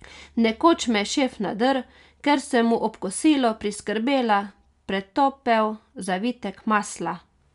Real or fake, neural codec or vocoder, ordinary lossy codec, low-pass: real; none; MP3, 96 kbps; 10.8 kHz